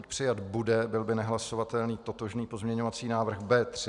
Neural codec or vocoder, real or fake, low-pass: none; real; 10.8 kHz